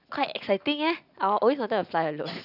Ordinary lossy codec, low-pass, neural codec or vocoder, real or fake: none; 5.4 kHz; none; real